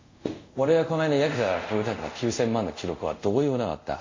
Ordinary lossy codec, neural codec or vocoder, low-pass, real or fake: MP3, 48 kbps; codec, 24 kHz, 0.5 kbps, DualCodec; 7.2 kHz; fake